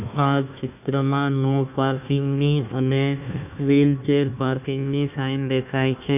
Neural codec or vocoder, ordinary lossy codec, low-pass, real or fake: codec, 16 kHz, 1 kbps, FunCodec, trained on Chinese and English, 50 frames a second; none; 3.6 kHz; fake